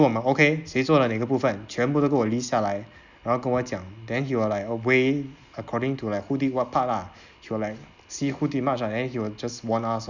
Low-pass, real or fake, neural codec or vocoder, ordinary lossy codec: 7.2 kHz; real; none; Opus, 64 kbps